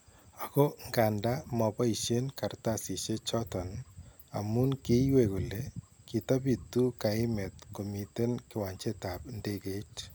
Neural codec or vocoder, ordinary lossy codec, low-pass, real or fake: none; none; none; real